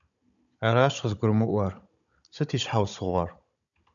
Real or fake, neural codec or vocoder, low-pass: fake; codec, 16 kHz, 16 kbps, FunCodec, trained on Chinese and English, 50 frames a second; 7.2 kHz